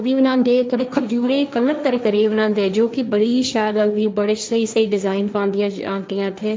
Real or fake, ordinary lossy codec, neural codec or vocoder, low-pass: fake; none; codec, 16 kHz, 1.1 kbps, Voila-Tokenizer; none